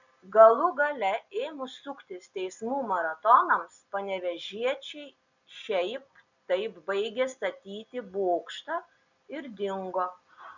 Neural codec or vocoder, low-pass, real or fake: none; 7.2 kHz; real